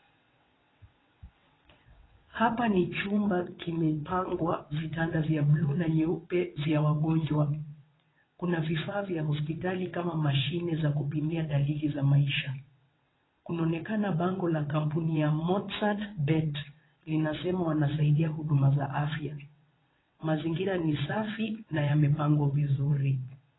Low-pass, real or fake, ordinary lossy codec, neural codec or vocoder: 7.2 kHz; fake; AAC, 16 kbps; vocoder, 22.05 kHz, 80 mel bands, WaveNeXt